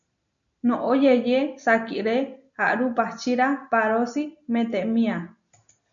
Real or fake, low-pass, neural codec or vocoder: real; 7.2 kHz; none